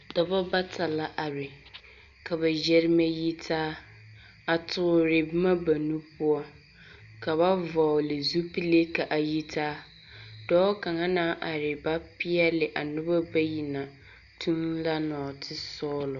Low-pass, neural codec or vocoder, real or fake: 7.2 kHz; none; real